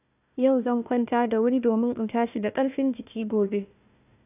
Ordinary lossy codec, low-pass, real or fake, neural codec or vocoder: none; 3.6 kHz; fake; codec, 16 kHz, 1 kbps, FunCodec, trained on Chinese and English, 50 frames a second